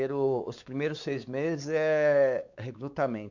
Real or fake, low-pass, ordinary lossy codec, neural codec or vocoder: fake; 7.2 kHz; none; codec, 16 kHz, 4 kbps, X-Codec, WavLM features, trained on Multilingual LibriSpeech